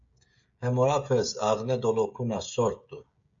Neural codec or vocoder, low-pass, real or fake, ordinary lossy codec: codec, 16 kHz, 16 kbps, FreqCodec, smaller model; 7.2 kHz; fake; MP3, 48 kbps